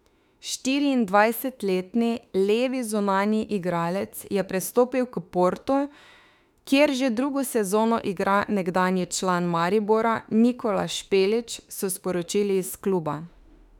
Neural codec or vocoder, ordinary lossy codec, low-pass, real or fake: autoencoder, 48 kHz, 32 numbers a frame, DAC-VAE, trained on Japanese speech; none; 19.8 kHz; fake